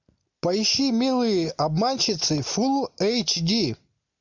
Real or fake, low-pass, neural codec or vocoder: real; 7.2 kHz; none